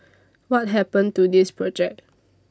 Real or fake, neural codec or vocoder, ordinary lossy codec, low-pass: real; none; none; none